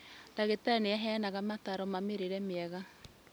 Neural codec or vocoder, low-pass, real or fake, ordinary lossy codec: none; none; real; none